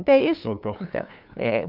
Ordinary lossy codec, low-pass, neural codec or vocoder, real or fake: none; 5.4 kHz; codec, 16 kHz, 2 kbps, FunCodec, trained on LibriTTS, 25 frames a second; fake